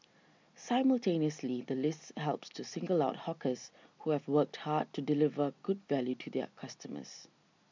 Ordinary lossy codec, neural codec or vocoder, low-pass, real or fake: MP3, 64 kbps; none; 7.2 kHz; real